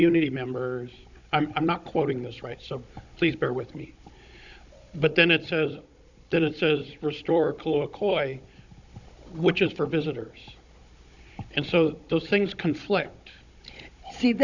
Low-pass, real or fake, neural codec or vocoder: 7.2 kHz; fake; codec, 16 kHz, 16 kbps, FunCodec, trained on Chinese and English, 50 frames a second